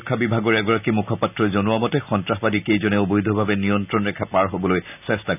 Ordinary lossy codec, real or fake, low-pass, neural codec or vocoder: none; real; 3.6 kHz; none